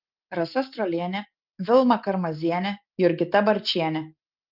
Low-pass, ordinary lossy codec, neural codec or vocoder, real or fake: 5.4 kHz; Opus, 24 kbps; none; real